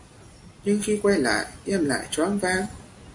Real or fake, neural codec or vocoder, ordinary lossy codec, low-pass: real; none; AAC, 64 kbps; 10.8 kHz